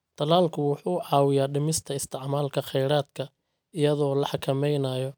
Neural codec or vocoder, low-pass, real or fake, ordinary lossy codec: none; none; real; none